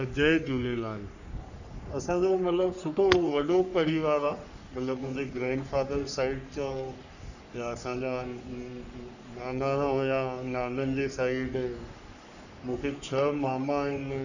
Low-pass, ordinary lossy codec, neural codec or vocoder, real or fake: 7.2 kHz; none; codec, 44.1 kHz, 3.4 kbps, Pupu-Codec; fake